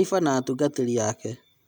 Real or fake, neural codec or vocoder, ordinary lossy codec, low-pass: real; none; none; none